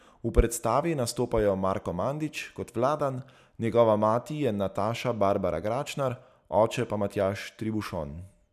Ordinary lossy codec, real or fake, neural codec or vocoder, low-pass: none; real; none; 14.4 kHz